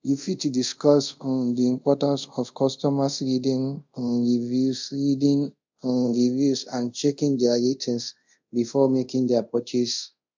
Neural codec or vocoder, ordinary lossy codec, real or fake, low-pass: codec, 24 kHz, 0.5 kbps, DualCodec; MP3, 64 kbps; fake; 7.2 kHz